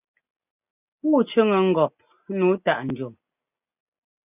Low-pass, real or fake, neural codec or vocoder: 3.6 kHz; real; none